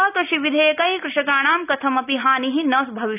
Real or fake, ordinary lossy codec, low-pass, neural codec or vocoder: real; none; 3.6 kHz; none